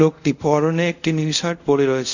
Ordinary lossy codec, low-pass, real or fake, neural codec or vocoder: none; 7.2 kHz; fake; codec, 24 kHz, 0.5 kbps, DualCodec